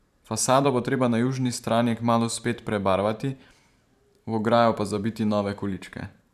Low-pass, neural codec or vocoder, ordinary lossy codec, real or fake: 14.4 kHz; vocoder, 44.1 kHz, 128 mel bands every 512 samples, BigVGAN v2; none; fake